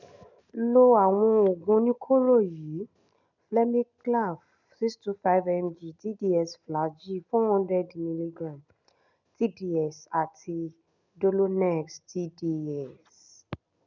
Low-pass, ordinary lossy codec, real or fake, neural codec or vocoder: 7.2 kHz; none; real; none